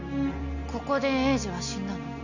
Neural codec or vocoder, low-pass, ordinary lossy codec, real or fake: none; 7.2 kHz; none; real